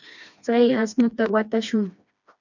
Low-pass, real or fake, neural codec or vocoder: 7.2 kHz; fake; codec, 16 kHz, 2 kbps, FreqCodec, smaller model